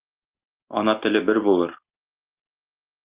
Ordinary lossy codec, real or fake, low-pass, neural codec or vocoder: Opus, 32 kbps; real; 3.6 kHz; none